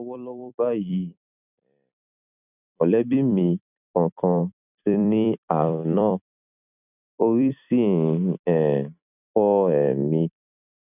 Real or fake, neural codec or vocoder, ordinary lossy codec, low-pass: fake; vocoder, 44.1 kHz, 128 mel bands every 256 samples, BigVGAN v2; none; 3.6 kHz